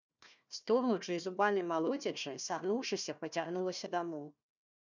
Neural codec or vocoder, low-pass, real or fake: codec, 16 kHz, 1 kbps, FunCodec, trained on Chinese and English, 50 frames a second; 7.2 kHz; fake